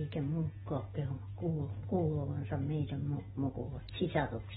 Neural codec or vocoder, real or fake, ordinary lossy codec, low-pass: none; real; AAC, 16 kbps; 14.4 kHz